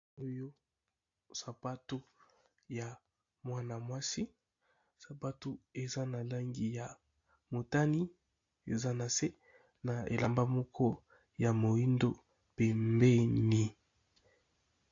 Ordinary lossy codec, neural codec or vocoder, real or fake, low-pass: AAC, 48 kbps; none; real; 7.2 kHz